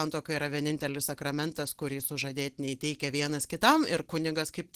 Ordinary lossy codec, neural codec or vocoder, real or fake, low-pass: Opus, 16 kbps; none; real; 14.4 kHz